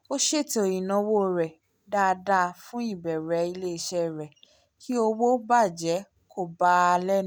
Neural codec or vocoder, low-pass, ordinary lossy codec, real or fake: none; none; none; real